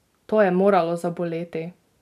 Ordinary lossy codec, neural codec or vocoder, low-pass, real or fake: none; none; 14.4 kHz; real